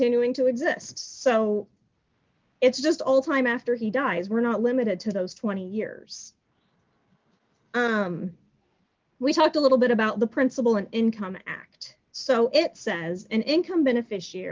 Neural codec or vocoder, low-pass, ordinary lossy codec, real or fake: none; 7.2 kHz; Opus, 24 kbps; real